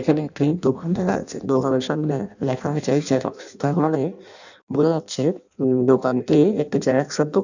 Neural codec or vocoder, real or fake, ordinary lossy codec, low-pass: codec, 16 kHz in and 24 kHz out, 0.6 kbps, FireRedTTS-2 codec; fake; none; 7.2 kHz